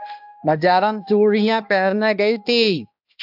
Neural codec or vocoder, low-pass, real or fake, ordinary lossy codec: codec, 16 kHz, 1 kbps, X-Codec, HuBERT features, trained on balanced general audio; 5.4 kHz; fake; AAC, 48 kbps